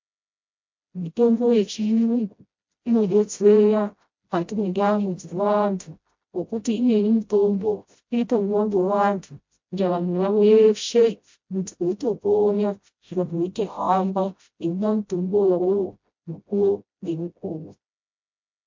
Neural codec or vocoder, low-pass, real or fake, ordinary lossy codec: codec, 16 kHz, 0.5 kbps, FreqCodec, smaller model; 7.2 kHz; fake; MP3, 48 kbps